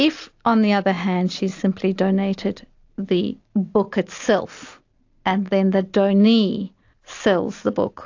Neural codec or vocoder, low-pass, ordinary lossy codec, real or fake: none; 7.2 kHz; AAC, 48 kbps; real